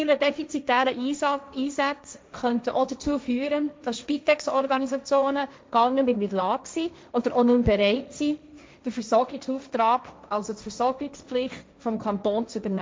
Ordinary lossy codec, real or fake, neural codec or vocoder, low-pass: none; fake; codec, 16 kHz, 1.1 kbps, Voila-Tokenizer; none